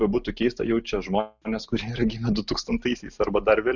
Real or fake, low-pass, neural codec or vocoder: real; 7.2 kHz; none